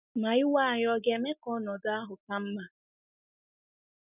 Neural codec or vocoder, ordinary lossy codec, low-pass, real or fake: none; none; 3.6 kHz; real